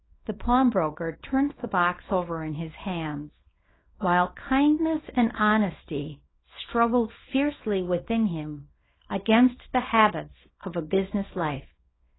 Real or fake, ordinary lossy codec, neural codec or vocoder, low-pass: fake; AAC, 16 kbps; codec, 24 kHz, 0.9 kbps, WavTokenizer, small release; 7.2 kHz